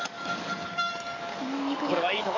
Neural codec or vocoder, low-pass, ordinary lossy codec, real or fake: codec, 44.1 kHz, 7.8 kbps, DAC; 7.2 kHz; none; fake